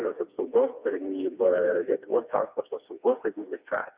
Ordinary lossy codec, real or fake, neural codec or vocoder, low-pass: Opus, 64 kbps; fake; codec, 16 kHz, 2 kbps, FreqCodec, smaller model; 3.6 kHz